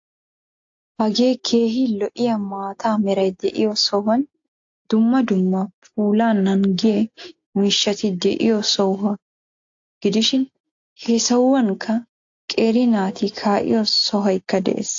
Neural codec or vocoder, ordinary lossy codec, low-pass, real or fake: none; AAC, 48 kbps; 7.2 kHz; real